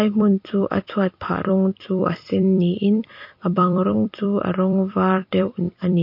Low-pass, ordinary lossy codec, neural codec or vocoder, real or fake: 5.4 kHz; MP3, 32 kbps; vocoder, 44.1 kHz, 128 mel bands every 256 samples, BigVGAN v2; fake